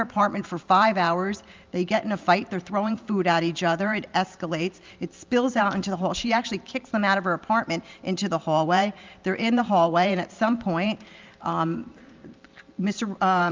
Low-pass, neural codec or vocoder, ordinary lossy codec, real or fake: 7.2 kHz; vocoder, 44.1 kHz, 128 mel bands every 512 samples, BigVGAN v2; Opus, 24 kbps; fake